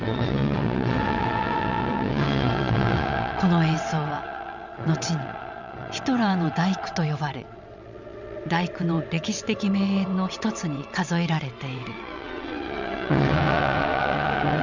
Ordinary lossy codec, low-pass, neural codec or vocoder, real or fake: none; 7.2 kHz; vocoder, 22.05 kHz, 80 mel bands, WaveNeXt; fake